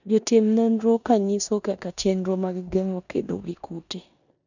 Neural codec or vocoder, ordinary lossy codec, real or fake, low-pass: codec, 16 kHz in and 24 kHz out, 0.9 kbps, LongCat-Audio-Codec, four codebook decoder; none; fake; 7.2 kHz